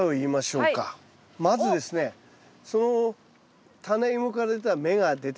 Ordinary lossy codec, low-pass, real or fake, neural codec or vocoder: none; none; real; none